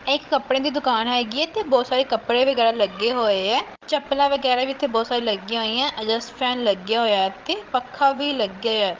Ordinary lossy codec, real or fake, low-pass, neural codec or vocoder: Opus, 32 kbps; fake; 7.2 kHz; codec, 16 kHz, 16 kbps, FreqCodec, larger model